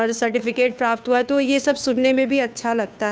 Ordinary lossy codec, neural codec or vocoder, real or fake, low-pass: none; codec, 16 kHz, 2 kbps, FunCodec, trained on Chinese and English, 25 frames a second; fake; none